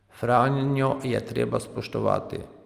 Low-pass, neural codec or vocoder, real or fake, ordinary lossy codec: 14.4 kHz; none; real; Opus, 32 kbps